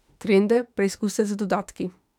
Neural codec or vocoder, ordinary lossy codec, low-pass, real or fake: autoencoder, 48 kHz, 32 numbers a frame, DAC-VAE, trained on Japanese speech; none; 19.8 kHz; fake